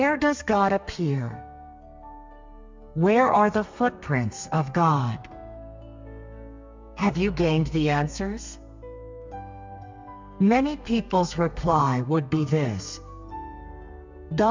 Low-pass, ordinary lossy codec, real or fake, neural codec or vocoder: 7.2 kHz; MP3, 64 kbps; fake; codec, 32 kHz, 1.9 kbps, SNAC